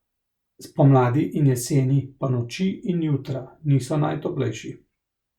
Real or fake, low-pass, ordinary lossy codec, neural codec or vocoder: real; 19.8 kHz; Opus, 64 kbps; none